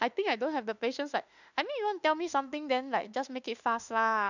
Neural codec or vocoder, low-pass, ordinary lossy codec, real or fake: autoencoder, 48 kHz, 32 numbers a frame, DAC-VAE, trained on Japanese speech; 7.2 kHz; none; fake